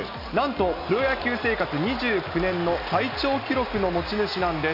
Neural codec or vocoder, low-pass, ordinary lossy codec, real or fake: none; 5.4 kHz; none; real